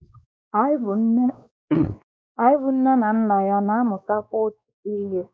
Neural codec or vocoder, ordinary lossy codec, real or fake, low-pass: codec, 16 kHz, 2 kbps, X-Codec, WavLM features, trained on Multilingual LibriSpeech; none; fake; none